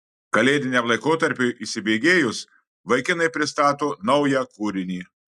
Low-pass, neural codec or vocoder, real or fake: 14.4 kHz; none; real